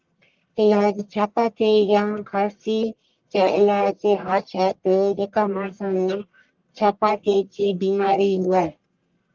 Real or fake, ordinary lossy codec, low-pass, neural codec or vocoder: fake; Opus, 32 kbps; 7.2 kHz; codec, 44.1 kHz, 1.7 kbps, Pupu-Codec